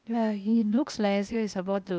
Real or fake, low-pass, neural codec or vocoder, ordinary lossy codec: fake; none; codec, 16 kHz, 0.8 kbps, ZipCodec; none